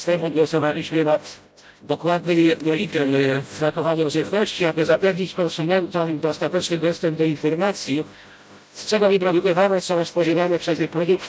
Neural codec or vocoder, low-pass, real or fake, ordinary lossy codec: codec, 16 kHz, 0.5 kbps, FreqCodec, smaller model; none; fake; none